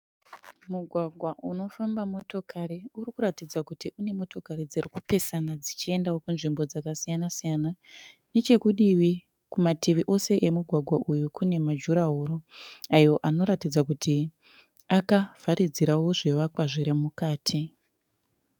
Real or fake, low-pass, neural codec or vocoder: fake; 19.8 kHz; codec, 44.1 kHz, 7.8 kbps, DAC